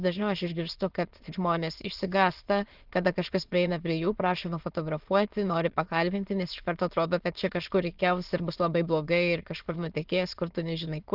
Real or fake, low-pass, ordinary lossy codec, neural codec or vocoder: fake; 5.4 kHz; Opus, 16 kbps; autoencoder, 22.05 kHz, a latent of 192 numbers a frame, VITS, trained on many speakers